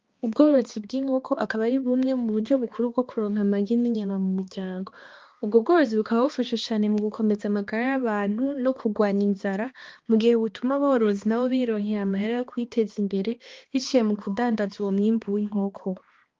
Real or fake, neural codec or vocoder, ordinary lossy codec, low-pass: fake; codec, 16 kHz, 2 kbps, X-Codec, HuBERT features, trained on balanced general audio; Opus, 32 kbps; 7.2 kHz